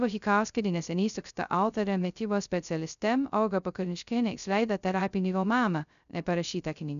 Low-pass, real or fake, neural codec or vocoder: 7.2 kHz; fake; codec, 16 kHz, 0.2 kbps, FocalCodec